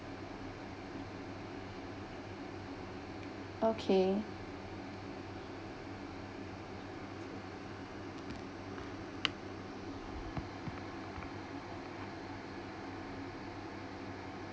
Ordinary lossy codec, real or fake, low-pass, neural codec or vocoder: none; real; none; none